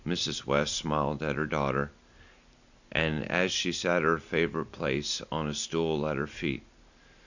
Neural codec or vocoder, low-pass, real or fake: none; 7.2 kHz; real